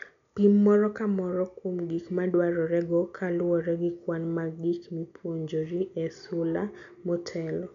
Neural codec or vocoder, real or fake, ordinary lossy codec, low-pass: none; real; none; 7.2 kHz